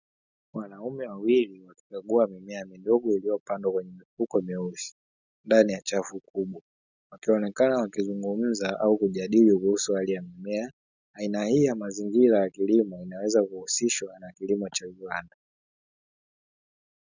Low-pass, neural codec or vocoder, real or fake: 7.2 kHz; none; real